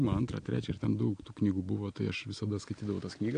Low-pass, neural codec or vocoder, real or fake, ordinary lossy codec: 9.9 kHz; none; real; AAC, 48 kbps